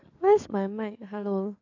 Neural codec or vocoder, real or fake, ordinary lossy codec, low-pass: codec, 16 kHz in and 24 kHz out, 2.2 kbps, FireRedTTS-2 codec; fake; AAC, 48 kbps; 7.2 kHz